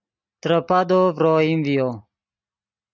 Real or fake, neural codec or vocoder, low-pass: real; none; 7.2 kHz